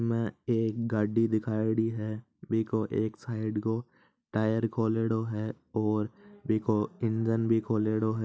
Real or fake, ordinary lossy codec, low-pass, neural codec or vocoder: real; none; none; none